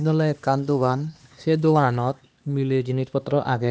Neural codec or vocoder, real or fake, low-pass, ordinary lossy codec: codec, 16 kHz, 2 kbps, X-Codec, HuBERT features, trained on LibriSpeech; fake; none; none